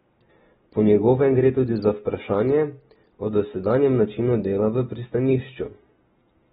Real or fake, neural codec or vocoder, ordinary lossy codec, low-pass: real; none; AAC, 16 kbps; 10.8 kHz